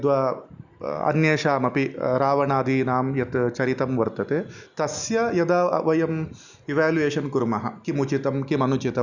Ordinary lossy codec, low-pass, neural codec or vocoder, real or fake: none; 7.2 kHz; none; real